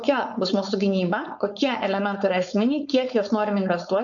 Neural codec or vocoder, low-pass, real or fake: codec, 16 kHz, 4.8 kbps, FACodec; 7.2 kHz; fake